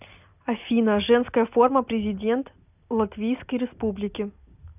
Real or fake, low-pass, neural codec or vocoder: real; 3.6 kHz; none